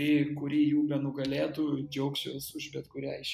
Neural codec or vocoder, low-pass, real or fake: none; 14.4 kHz; real